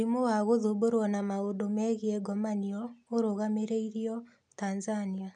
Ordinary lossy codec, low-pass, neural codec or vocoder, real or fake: none; 9.9 kHz; none; real